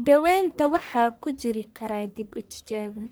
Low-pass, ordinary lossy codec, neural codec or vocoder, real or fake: none; none; codec, 44.1 kHz, 1.7 kbps, Pupu-Codec; fake